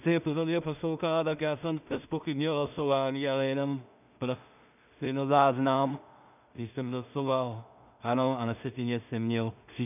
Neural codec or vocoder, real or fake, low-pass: codec, 16 kHz in and 24 kHz out, 0.4 kbps, LongCat-Audio-Codec, two codebook decoder; fake; 3.6 kHz